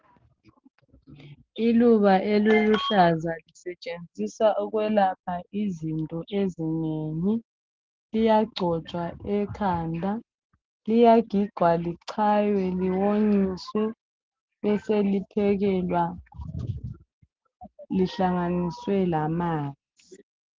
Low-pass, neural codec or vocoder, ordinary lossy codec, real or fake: 7.2 kHz; none; Opus, 16 kbps; real